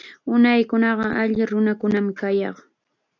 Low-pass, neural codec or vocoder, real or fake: 7.2 kHz; none; real